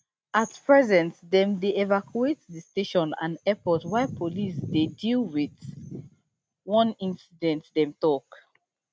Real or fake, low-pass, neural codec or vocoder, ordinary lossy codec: real; none; none; none